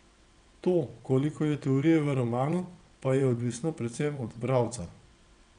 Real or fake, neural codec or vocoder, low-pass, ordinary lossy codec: fake; vocoder, 22.05 kHz, 80 mel bands, WaveNeXt; 9.9 kHz; none